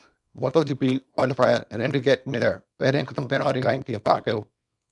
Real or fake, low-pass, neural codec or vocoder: fake; 10.8 kHz; codec, 24 kHz, 0.9 kbps, WavTokenizer, small release